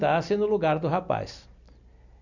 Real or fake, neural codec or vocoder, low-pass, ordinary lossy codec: real; none; 7.2 kHz; none